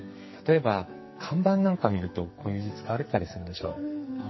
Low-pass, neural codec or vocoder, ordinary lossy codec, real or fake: 7.2 kHz; codec, 44.1 kHz, 2.6 kbps, SNAC; MP3, 24 kbps; fake